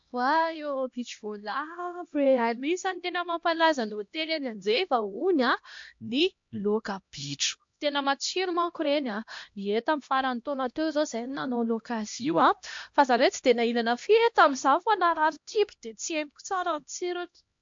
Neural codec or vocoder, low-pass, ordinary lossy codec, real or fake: codec, 16 kHz, 1 kbps, X-Codec, HuBERT features, trained on LibriSpeech; 7.2 kHz; MP3, 48 kbps; fake